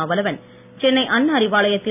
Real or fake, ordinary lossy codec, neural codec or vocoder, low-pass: real; none; none; 3.6 kHz